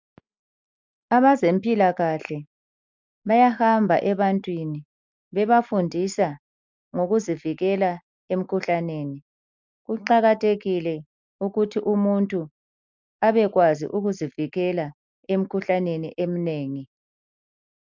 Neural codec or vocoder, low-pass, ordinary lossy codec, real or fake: none; 7.2 kHz; MP3, 64 kbps; real